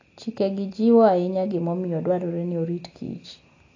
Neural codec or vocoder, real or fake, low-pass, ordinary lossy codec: none; real; 7.2 kHz; AAC, 32 kbps